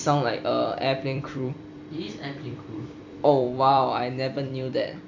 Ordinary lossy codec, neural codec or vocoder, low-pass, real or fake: none; vocoder, 44.1 kHz, 128 mel bands every 256 samples, BigVGAN v2; 7.2 kHz; fake